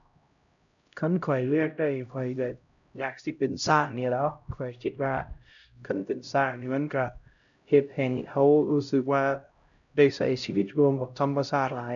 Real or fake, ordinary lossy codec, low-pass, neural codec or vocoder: fake; none; 7.2 kHz; codec, 16 kHz, 0.5 kbps, X-Codec, HuBERT features, trained on LibriSpeech